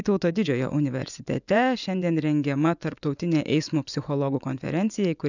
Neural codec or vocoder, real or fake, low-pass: vocoder, 24 kHz, 100 mel bands, Vocos; fake; 7.2 kHz